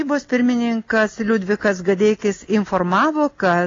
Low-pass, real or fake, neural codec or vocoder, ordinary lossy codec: 7.2 kHz; real; none; AAC, 32 kbps